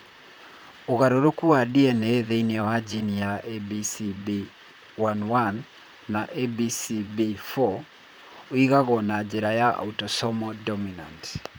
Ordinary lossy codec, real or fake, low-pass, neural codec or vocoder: none; fake; none; vocoder, 44.1 kHz, 128 mel bands, Pupu-Vocoder